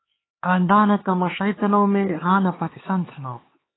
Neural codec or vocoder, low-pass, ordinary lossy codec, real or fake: codec, 16 kHz, 4 kbps, X-Codec, HuBERT features, trained on LibriSpeech; 7.2 kHz; AAC, 16 kbps; fake